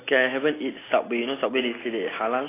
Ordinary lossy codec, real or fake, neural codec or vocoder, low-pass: AAC, 16 kbps; real; none; 3.6 kHz